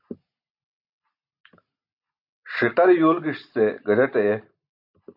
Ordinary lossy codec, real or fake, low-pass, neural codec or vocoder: AAC, 48 kbps; real; 5.4 kHz; none